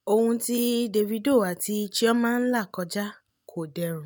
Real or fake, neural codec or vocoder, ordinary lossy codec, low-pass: real; none; none; none